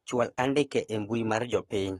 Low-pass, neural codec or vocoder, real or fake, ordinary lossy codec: 19.8 kHz; codec, 44.1 kHz, 7.8 kbps, Pupu-Codec; fake; AAC, 32 kbps